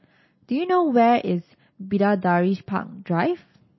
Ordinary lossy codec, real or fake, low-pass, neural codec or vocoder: MP3, 24 kbps; real; 7.2 kHz; none